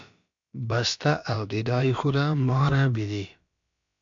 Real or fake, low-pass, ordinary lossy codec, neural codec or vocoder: fake; 7.2 kHz; MP3, 64 kbps; codec, 16 kHz, about 1 kbps, DyCAST, with the encoder's durations